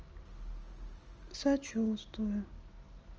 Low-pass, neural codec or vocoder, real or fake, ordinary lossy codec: 7.2 kHz; none; real; Opus, 24 kbps